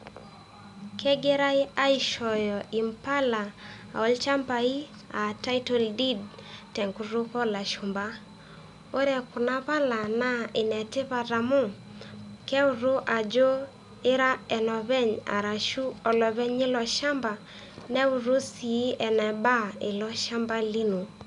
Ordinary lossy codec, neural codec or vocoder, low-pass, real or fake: none; none; 10.8 kHz; real